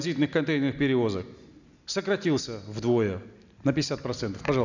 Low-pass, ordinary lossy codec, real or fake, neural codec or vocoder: 7.2 kHz; none; real; none